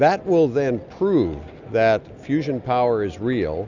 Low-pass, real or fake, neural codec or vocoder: 7.2 kHz; real; none